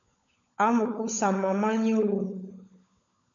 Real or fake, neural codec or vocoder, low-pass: fake; codec, 16 kHz, 16 kbps, FunCodec, trained on LibriTTS, 50 frames a second; 7.2 kHz